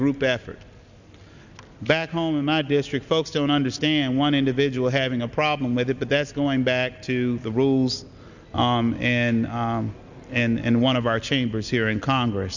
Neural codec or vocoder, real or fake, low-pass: none; real; 7.2 kHz